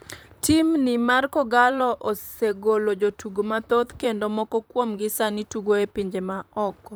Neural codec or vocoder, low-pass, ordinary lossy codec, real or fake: vocoder, 44.1 kHz, 128 mel bands, Pupu-Vocoder; none; none; fake